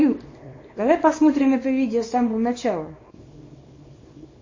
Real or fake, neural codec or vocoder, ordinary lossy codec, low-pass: fake; codec, 24 kHz, 0.9 kbps, WavTokenizer, small release; MP3, 32 kbps; 7.2 kHz